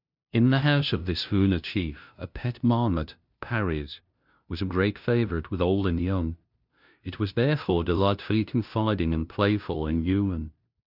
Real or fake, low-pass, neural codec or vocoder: fake; 5.4 kHz; codec, 16 kHz, 0.5 kbps, FunCodec, trained on LibriTTS, 25 frames a second